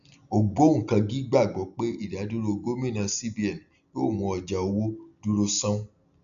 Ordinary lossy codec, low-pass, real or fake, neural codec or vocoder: none; 7.2 kHz; real; none